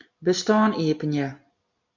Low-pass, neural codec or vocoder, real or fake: 7.2 kHz; none; real